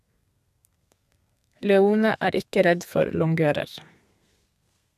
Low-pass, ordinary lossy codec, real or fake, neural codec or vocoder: 14.4 kHz; none; fake; codec, 32 kHz, 1.9 kbps, SNAC